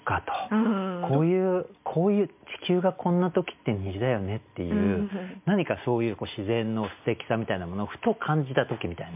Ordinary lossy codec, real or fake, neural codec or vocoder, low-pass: MP3, 32 kbps; real; none; 3.6 kHz